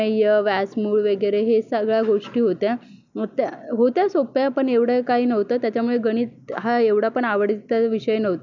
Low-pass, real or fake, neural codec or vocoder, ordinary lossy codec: 7.2 kHz; real; none; none